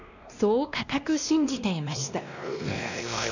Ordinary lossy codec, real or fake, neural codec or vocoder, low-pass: none; fake; codec, 16 kHz, 1 kbps, X-Codec, WavLM features, trained on Multilingual LibriSpeech; 7.2 kHz